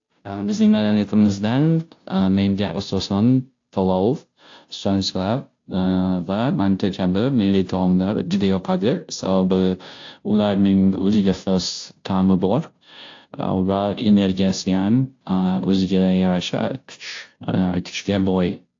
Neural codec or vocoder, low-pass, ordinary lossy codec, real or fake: codec, 16 kHz, 0.5 kbps, FunCodec, trained on Chinese and English, 25 frames a second; 7.2 kHz; AAC, 48 kbps; fake